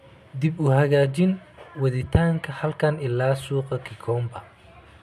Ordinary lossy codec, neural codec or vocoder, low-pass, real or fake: none; none; 14.4 kHz; real